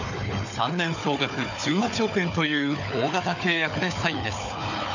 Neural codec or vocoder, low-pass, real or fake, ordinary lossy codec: codec, 16 kHz, 4 kbps, FunCodec, trained on Chinese and English, 50 frames a second; 7.2 kHz; fake; none